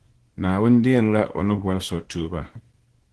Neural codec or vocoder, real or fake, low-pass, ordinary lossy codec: codec, 24 kHz, 0.9 kbps, WavTokenizer, small release; fake; 10.8 kHz; Opus, 16 kbps